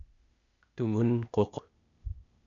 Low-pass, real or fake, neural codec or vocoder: 7.2 kHz; fake; codec, 16 kHz, 0.8 kbps, ZipCodec